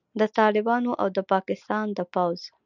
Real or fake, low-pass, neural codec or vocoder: real; 7.2 kHz; none